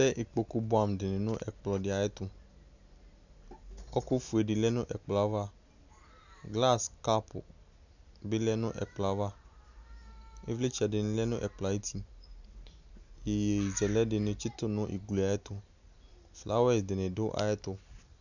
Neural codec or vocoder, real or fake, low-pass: none; real; 7.2 kHz